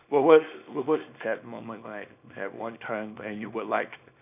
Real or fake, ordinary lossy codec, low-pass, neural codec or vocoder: fake; none; 3.6 kHz; codec, 24 kHz, 0.9 kbps, WavTokenizer, small release